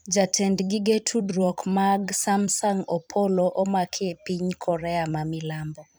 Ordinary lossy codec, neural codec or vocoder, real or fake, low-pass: none; vocoder, 44.1 kHz, 128 mel bands every 256 samples, BigVGAN v2; fake; none